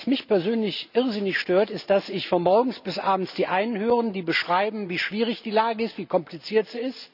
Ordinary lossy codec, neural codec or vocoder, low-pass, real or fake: none; none; 5.4 kHz; real